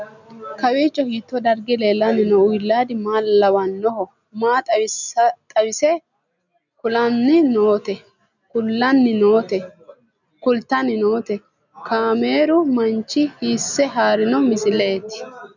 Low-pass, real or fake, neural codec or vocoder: 7.2 kHz; real; none